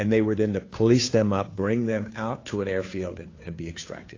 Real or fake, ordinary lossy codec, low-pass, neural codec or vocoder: fake; AAC, 32 kbps; 7.2 kHz; codec, 16 kHz, 2 kbps, FunCodec, trained on Chinese and English, 25 frames a second